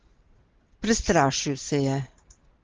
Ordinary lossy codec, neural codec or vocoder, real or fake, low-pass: Opus, 16 kbps; none; real; 7.2 kHz